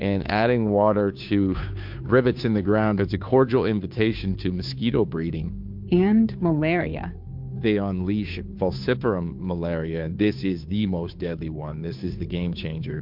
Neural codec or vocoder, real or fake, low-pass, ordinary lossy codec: codec, 16 kHz, 2 kbps, FunCodec, trained on Chinese and English, 25 frames a second; fake; 5.4 kHz; MP3, 48 kbps